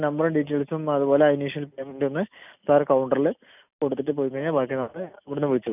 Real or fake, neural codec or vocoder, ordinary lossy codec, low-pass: real; none; none; 3.6 kHz